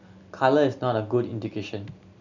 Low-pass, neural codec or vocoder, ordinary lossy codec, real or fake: 7.2 kHz; none; none; real